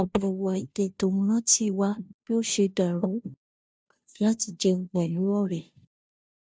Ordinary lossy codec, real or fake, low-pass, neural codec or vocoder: none; fake; none; codec, 16 kHz, 0.5 kbps, FunCodec, trained on Chinese and English, 25 frames a second